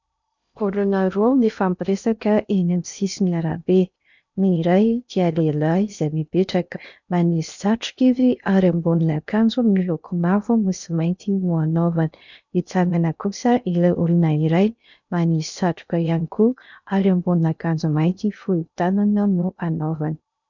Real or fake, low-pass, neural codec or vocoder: fake; 7.2 kHz; codec, 16 kHz in and 24 kHz out, 0.6 kbps, FocalCodec, streaming, 2048 codes